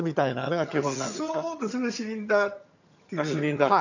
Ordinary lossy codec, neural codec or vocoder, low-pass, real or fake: none; vocoder, 22.05 kHz, 80 mel bands, HiFi-GAN; 7.2 kHz; fake